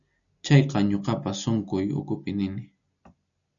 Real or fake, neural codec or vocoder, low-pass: real; none; 7.2 kHz